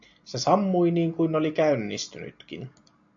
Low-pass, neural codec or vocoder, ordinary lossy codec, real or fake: 7.2 kHz; none; MP3, 64 kbps; real